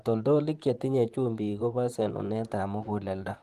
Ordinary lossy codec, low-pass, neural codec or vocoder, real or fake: Opus, 24 kbps; 14.4 kHz; vocoder, 44.1 kHz, 128 mel bands, Pupu-Vocoder; fake